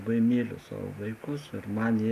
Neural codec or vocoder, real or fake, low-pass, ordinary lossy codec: none; real; 14.4 kHz; AAC, 64 kbps